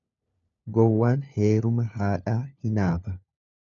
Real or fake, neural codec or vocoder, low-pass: fake; codec, 16 kHz, 4 kbps, FunCodec, trained on LibriTTS, 50 frames a second; 7.2 kHz